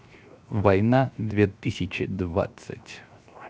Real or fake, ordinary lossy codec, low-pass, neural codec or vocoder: fake; none; none; codec, 16 kHz, 0.7 kbps, FocalCodec